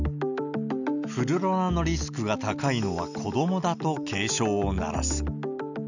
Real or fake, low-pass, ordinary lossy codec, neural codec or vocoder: real; 7.2 kHz; none; none